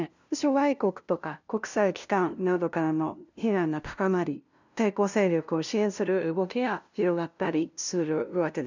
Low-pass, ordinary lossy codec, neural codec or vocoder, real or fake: 7.2 kHz; none; codec, 16 kHz, 0.5 kbps, FunCodec, trained on LibriTTS, 25 frames a second; fake